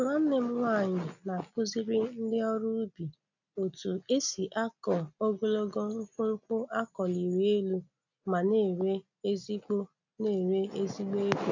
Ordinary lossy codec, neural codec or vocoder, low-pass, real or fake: none; none; 7.2 kHz; real